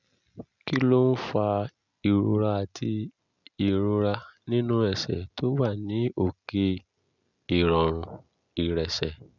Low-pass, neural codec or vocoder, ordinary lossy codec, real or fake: 7.2 kHz; none; none; real